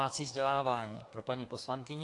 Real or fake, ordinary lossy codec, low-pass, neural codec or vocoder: fake; AAC, 48 kbps; 10.8 kHz; codec, 24 kHz, 1 kbps, SNAC